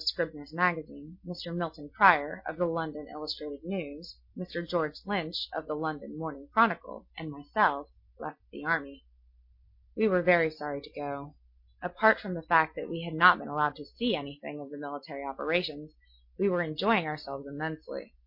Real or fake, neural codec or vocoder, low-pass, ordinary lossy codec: real; none; 5.4 kHz; MP3, 32 kbps